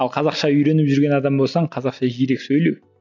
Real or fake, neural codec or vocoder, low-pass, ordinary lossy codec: real; none; 7.2 kHz; MP3, 64 kbps